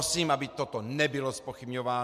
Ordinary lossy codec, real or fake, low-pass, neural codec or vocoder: MP3, 96 kbps; real; 14.4 kHz; none